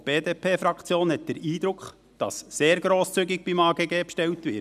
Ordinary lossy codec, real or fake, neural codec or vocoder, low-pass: none; real; none; 14.4 kHz